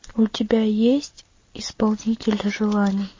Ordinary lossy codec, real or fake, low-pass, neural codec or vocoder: MP3, 32 kbps; real; 7.2 kHz; none